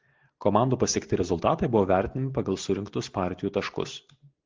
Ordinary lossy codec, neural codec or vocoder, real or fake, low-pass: Opus, 16 kbps; none; real; 7.2 kHz